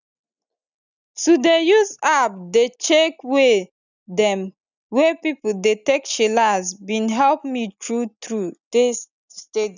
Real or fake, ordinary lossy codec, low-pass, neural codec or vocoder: real; none; 7.2 kHz; none